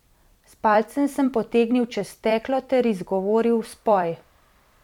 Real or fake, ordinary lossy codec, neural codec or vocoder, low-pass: fake; MP3, 96 kbps; vocoder, 44.1 kHz, 128 mel bands every 256 samples, BigVGAN v2; 19.8 kHz